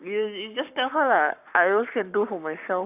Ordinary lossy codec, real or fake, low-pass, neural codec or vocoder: none; fake; 3.6 kHz; codec, 44.1 kHz, 7.8 kbps, Pupu-Codec